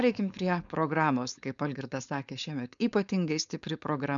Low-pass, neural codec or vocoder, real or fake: 7.2 kHz; codec, 16 kHz, 4.8 kbps, FACodec; fake